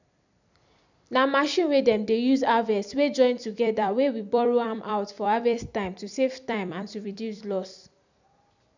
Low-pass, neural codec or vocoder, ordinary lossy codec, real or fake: 7.2 kHz; vocoder, 44.1 kHz, 128 mel bands every 256 samples, BigVGAN v2; none; fake